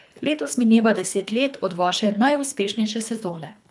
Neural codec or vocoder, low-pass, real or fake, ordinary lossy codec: codec, 24 kHz, 3 kbps, HILCodec; none; fake; none